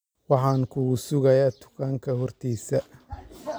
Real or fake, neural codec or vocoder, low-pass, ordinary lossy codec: real; none; none; none